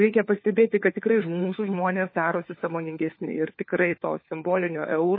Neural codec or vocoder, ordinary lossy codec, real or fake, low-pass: codec, 16 kHz, 4 kbps, FunCodec, trained on LibriTTS, 50 frames a second; MP3, 24 kbps; fake; 5.4 kHz